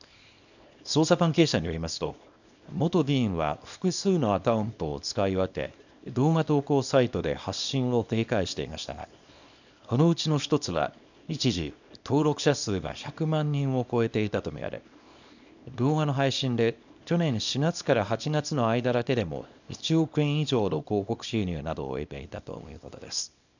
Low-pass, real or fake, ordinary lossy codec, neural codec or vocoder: 7.2 kHz; fake; none; codec, 24 kHz, 0.9 kbps, WavTokenizer, small release